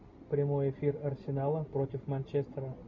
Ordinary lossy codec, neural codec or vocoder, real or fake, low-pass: MP3, 48 kbps; none; real; 7.2 kHz